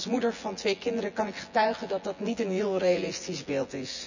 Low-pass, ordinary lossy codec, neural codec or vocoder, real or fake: 7.2 kHz; none; vocoder, 24 kHz, 100 mel bands, Vocos; fake